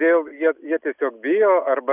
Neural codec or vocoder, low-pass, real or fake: none; 3.6 kHz; real